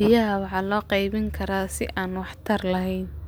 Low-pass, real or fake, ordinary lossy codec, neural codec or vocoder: none; real; none; none